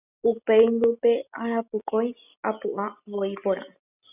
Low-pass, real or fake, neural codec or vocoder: 3.6 kHz; real; none